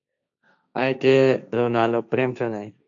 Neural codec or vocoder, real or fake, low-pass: codec, 16 kHz, 1.1 kbps, Voila-Tokenizer; fake; 7.2 kHz